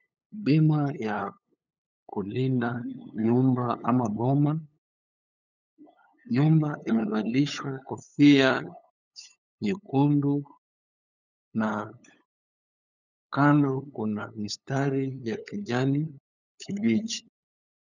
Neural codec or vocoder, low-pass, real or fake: codec, 16 kHz, 8 kbps, FunCodec, trained on LibriTTS, 25 frames a second; 7.2 kHz; fake